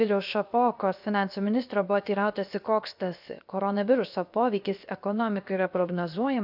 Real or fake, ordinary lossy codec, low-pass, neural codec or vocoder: fake; AAC, 48 kbps; 5.4 kHz; codec, 16 kHz, 0.7 kbps, FocalCodec